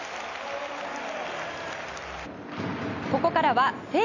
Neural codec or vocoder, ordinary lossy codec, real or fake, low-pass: none; none; real; 7.2 kHz